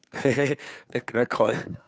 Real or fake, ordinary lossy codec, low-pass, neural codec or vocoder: fake; none; none; codec, 16 kHz, 2 kbps, FunCodec, trained on Chinese and English, 25 frames a second